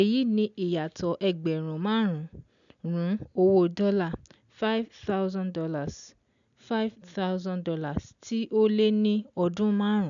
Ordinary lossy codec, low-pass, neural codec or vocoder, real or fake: none; 7.2 kHz; none; real